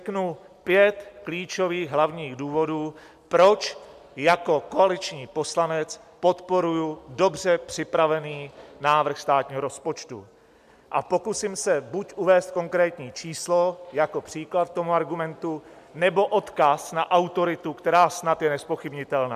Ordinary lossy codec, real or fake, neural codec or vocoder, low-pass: MP3, 96 kbps; real; none; 14.4 kHz